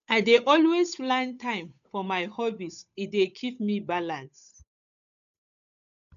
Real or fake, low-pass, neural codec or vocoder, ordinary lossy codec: fake; 7.2 kHz; codec, 16 kHz, 8 kbps, FunCodec, trained on Chinese and English, 25 frames a second; none